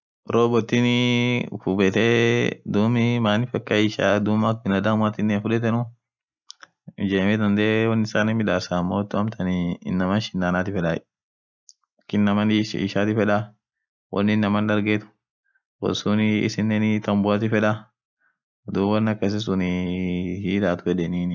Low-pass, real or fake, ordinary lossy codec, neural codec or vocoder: 7.2 kHz; real; none; none